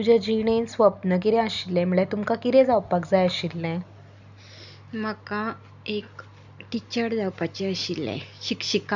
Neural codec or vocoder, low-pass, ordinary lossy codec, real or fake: none; 7.2 kHz; none; real